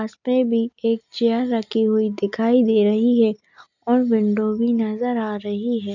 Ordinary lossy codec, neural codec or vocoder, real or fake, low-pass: none; none; real; 7.2 kHz